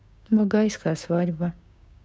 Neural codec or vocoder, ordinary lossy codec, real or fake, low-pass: codec, 16 kHz, 6 kbps, DAC; none; fake; none